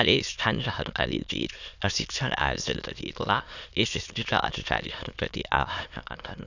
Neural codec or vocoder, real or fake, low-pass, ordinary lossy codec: autoencoder, 22.05 kHz, a latent of 192 numbers a frame, VITS, trained on many speakers; fake; 7.2 kHz; none